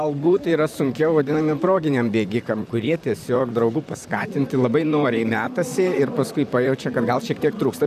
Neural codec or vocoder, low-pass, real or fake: vocoder, 44.1 kHz, 128 mel bands, Pupu-Vocoder; 14.4 kHz; fake